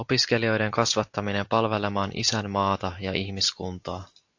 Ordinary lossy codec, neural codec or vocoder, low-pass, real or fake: AAC, 48 kbps; none; 7.2 kHz; real